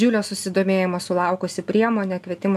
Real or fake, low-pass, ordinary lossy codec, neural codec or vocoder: real; 14.4 kHz; MP3, 96 kbps; none